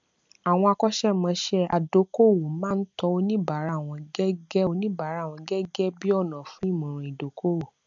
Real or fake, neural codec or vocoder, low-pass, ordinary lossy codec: real; none; 7.2 kHz; MP3, 64 kbps